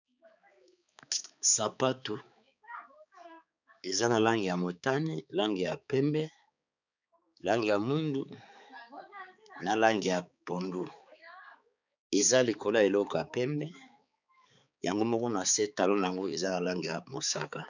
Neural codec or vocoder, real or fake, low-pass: codec, 16 kHz, 4 kbps, X-Codec, HuBERT features, trained on balanced general audio; fake; 7.2 kHz